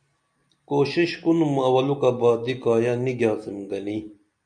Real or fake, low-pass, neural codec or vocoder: real; 9.9 kHz; none